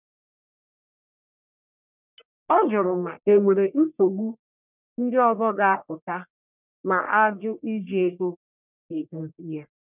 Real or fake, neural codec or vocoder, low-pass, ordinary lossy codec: fake; codec, 44.1 kHz, 1.7 kbps, Pupu-Codec; 3.6 kHz; none